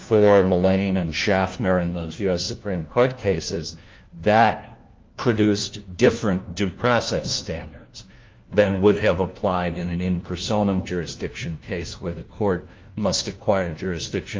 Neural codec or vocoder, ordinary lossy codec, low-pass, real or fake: codec, 16 kHz, 1 kbps, FunCodec, trained on LibriTTS, 50 frames a second; Opus, 32 kbps; 7.2 kHz; fake